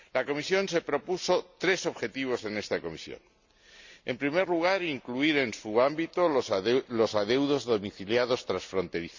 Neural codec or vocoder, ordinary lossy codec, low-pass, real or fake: none; Opus, 64 kbps; 7.2 kHz; real